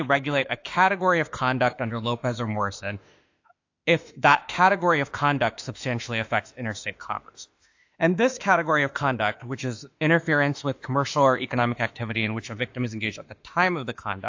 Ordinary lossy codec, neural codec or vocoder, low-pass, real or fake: AAC, 48 kbps; autoencoder, 48 kHz, 32 numbers a frame, DAC-VAE, trained on Japanese speech; 7.2 kHz; fake